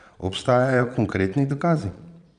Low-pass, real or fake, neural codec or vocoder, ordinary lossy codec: 9.9 kHz; fake; vocoder, 22.05 kHz, 80 mel bands, Vocos; none